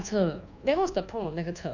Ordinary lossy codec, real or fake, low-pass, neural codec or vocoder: none; fake; 7.2 kHz; codec, 24 kHz, 1.2 kbps, DualCodec